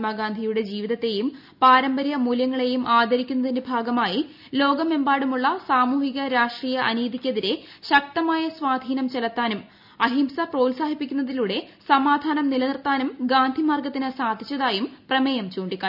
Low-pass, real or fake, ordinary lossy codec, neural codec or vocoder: 5.4 kHz; real; none; none